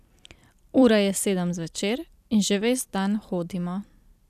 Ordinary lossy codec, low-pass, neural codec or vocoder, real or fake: none; 14.4 kHz; vocoder, 44.1 kHz, 128 mel bands every 256 samples, BigVGAN v2; fake